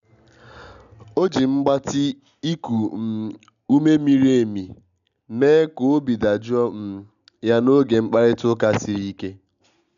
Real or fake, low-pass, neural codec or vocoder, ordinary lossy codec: real; 7.2 kHz; none; none